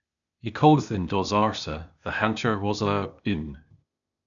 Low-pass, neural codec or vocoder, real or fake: 7.2 kHz; codec, 16 kHz, 0.8 kbps, ZipCodec; fake